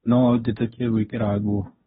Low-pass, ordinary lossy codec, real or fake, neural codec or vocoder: 7.2 kHz; AAC, 16 kbps; fake; codec, 16 kHz, 1.1 kbps, Voila-Tokenizer